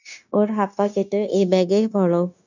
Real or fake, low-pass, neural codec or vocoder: fake; 7.2 kHz; codec, 16 kHz, 0.9 kbps, LongCat-Audio-Codec